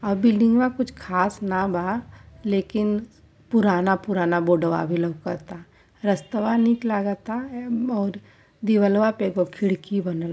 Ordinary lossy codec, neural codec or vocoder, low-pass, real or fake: none; none; none; real